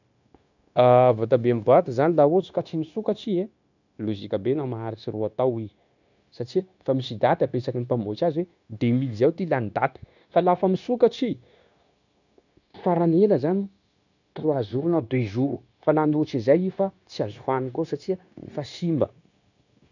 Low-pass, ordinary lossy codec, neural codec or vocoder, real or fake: 7.2 kHz; none; codec, 16 kHz, 0.9 kbps, LongCat-Audio-Codec; fake